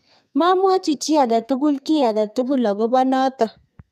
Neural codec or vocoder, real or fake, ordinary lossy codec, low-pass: codec, 32 kHz, 1.9 kbps, SNAC; fake; none; 14.4 kHz